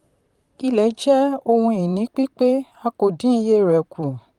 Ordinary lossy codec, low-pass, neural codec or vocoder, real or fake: Opus, 32 kbps; 19.8 kHz; vocoder, 44.1 kHz, 128 mel bands, Pupu-Vocoder; fake